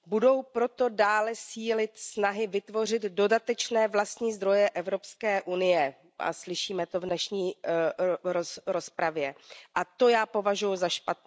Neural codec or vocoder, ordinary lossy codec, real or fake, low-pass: none; none; real; none